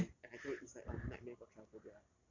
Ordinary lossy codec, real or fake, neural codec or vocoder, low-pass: MP3, 48 kbps; real; none; 7.2 kHz